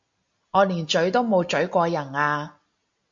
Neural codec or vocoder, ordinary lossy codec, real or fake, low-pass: none; AAC, 64 kbps; real; 7.2 kHz